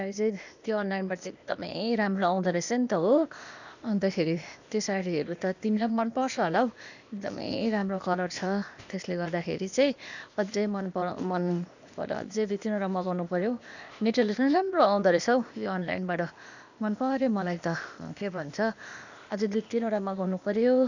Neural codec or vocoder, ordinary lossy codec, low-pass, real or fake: codec, 16 kHz, 0.8 kbps, ZipCodec; none; 7.2 kHz; fake